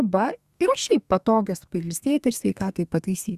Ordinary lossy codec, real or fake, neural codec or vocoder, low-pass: Opus, 64 kbps; fake; codec, 32 kHz, 1.9 kbps, SNAC; 14.4 kHz